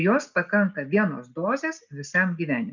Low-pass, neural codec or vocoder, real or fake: 7.2 kHz; none; real